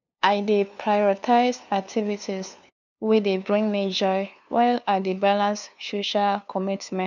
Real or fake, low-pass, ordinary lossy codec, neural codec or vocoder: fake; 7.2 kHz; none; codec, 16 kHz, 2 kbps, FunCodec, trained on LibriTTS, 25 frames a second